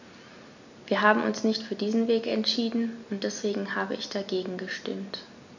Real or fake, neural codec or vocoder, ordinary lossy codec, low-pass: real; none; none; 7.2 kHz